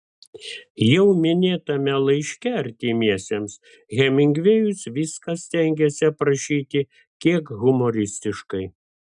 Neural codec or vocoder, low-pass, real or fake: none; 10.8 kHz; real